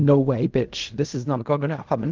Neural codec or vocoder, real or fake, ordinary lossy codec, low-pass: codec, 16 kHz in and 24 kHz out, 0.4 kbps, LongCat-Audio-Codec, fine tuned four codebook decoder; fake; Opus, 24 kbps; 7.2 kHz